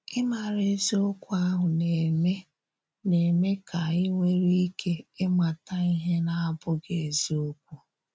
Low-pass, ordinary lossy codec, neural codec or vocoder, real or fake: none; none; none; real